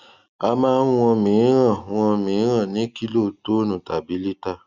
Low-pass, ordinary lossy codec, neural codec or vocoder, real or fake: 7.2 kHz; Opus, 64 kbps; none; real